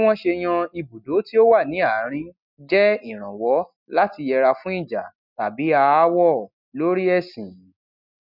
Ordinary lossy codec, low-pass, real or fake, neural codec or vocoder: none; 5.4 kHz; real; none